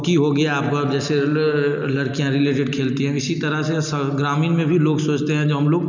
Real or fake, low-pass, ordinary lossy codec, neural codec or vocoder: real; 7.2 kHz; none; none